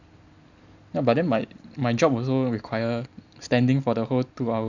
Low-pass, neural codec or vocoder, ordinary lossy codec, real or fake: 7.2 kHz; none; none; real